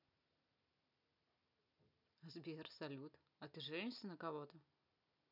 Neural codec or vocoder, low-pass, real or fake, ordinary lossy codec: none; 5.4 kHz; real; none